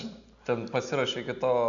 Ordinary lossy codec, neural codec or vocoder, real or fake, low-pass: MP3, 96 kbps; none; real; 7.2 kHz